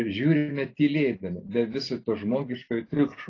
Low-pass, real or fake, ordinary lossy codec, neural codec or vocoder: 7.2 kHz; real; AAC, 32 kbps; none